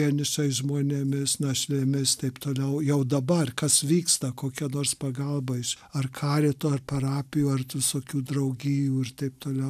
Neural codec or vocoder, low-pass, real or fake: none; 14.4 kHz; real